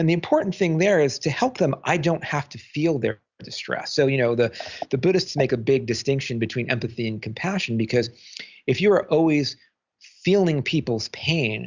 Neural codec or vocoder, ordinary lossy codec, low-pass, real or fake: none; Opus, 64 kbps; 7.2 kHz; real